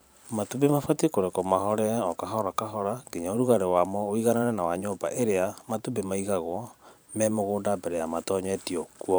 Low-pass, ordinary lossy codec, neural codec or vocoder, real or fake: none; none; vocoder, 44.1 kHz, 128 mel bands every 512 samples, BigVGAN v2; fake